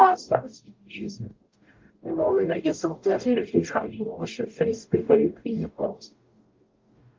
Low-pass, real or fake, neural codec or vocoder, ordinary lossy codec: 7.2 kHz; fake; codec, 44.1 kHz, 0.9 kbps, DAC; Opus, 24 kbps